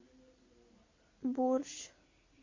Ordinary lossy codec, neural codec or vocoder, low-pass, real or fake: MP3, 48 kbps; none; 7.2 kHz; real